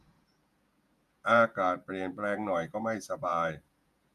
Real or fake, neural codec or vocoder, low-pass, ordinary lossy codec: fake; vocoder, 48 kHz, 128 mel bands, Vocos; 14.4 kHz; none